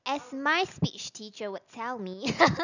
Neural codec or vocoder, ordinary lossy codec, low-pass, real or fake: none; none; 7.2 kHz; real